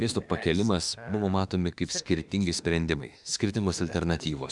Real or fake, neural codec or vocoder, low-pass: fake; autoencoder, 48 kHz, 32 numbers a frame, DAC-VAE, trained on Japanese speech; 10.8 kHz